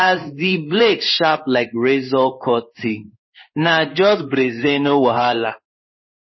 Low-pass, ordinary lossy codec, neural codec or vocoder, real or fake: 7.2 kHz; MP3, 24 kbps; codec, 16 kHz in and 24 kHz out, 1 kbps, XY-Tokenizer; fake